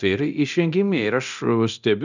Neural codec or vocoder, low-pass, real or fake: codec, 24 kHz, 0.9 kbps, DualCodec; 7.2 kHz; fake